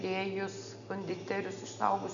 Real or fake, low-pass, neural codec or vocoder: real; 7.2 kHz; none